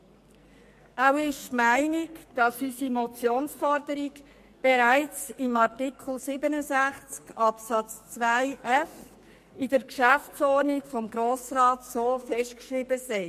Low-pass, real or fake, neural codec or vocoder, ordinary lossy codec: 14.4 kHz; fake; codec, 44.1 kHz, 2.6 kbps, SNAC; MP3, 64 kbps